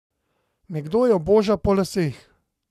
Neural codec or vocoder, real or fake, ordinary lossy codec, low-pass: codec, 44.1 kHz, 7.8 kbps, Pupu-Codec; fake; none; 14.4 kHz